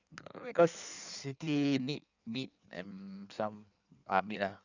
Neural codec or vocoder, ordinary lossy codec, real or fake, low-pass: codec, 16 kHz in and 24 kHz out, 1.1 kbps, FireRedTTS-2 codec; none; fake; 7.2 kHz